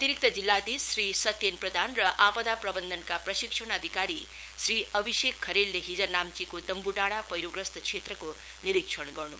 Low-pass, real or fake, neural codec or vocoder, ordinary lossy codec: none; fake; codec, 16 kHz, 8 kbps, FunCodec, trained on LibriTTS, 25 frames a second; none